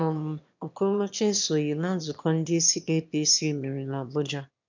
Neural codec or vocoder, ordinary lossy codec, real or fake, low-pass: autoencoder, 22.05 kHz, a latent of 192 numbers a frame, VITS, trained on one speaker; MP3, 64 kbps; fake; 7.2 kHz